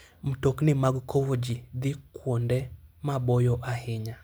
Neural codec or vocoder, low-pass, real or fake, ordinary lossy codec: none; none; real; none